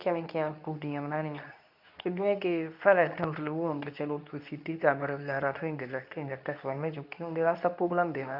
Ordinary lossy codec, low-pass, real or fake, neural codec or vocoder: none; 5.4 kHz; fake; codec, 24 kHz, 0.9 kbps, WavTokenizer, medium speech release version 2